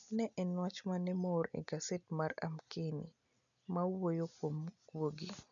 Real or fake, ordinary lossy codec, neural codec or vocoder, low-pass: real; none; none; 7.2 kHz